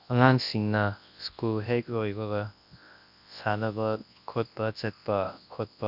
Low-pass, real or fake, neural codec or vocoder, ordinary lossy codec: 5.4 kHz; fake; codec, 24 kHz, 0.9 kbps, WavTokenizer, large speech release; none